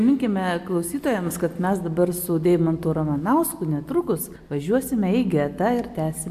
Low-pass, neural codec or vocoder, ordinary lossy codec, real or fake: 14.4 kHz; none; AAC, 96 kbps; real